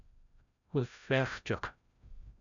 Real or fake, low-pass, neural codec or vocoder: fake; 7.2 kHz; codec, 16 kHz, 0.5 kbps, FreqCodec, larger model